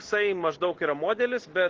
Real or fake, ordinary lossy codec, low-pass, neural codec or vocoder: real; Opus, 16 kbps; 7.2 kHz; none